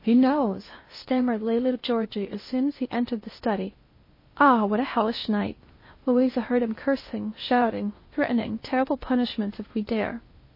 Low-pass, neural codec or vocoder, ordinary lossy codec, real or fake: 5.4 kHz; codec, 16 kHz in and 24 kHz out, 0.6 kbps, FocalCodec, streaming, 2048 codes; MP3, 24 kbps; fake